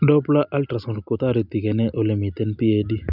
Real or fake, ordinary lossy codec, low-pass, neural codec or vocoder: real; none; 5.4 kHz; none